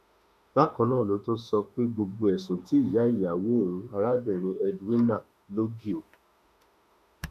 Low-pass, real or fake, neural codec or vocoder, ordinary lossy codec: 14.4 kHz; fake; autoencoder, 48 kHz, 32 numbers a frame, DAC-VAE, trained on Japanese speech; none